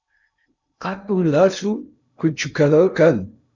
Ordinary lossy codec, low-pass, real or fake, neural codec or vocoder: Opus, 64 kbps; 7.2 kHz; fake; codec, 16 kHz in and 24 kHz out, 0.6 kbps, FocalCodec, streaming, 2048 codes